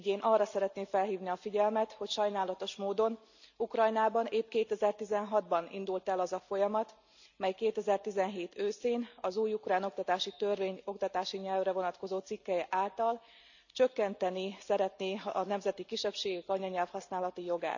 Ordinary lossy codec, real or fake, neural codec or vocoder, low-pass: none; real; none; 7.2 kHz